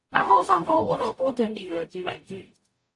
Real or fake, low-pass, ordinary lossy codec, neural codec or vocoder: fake; 10.8 kHz; AAC, 48 kbps; codec, 44.1 kHz, 0.9 kbps, DAC